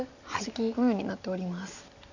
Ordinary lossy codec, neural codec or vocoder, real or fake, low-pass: none; none; real; 7.2 kHz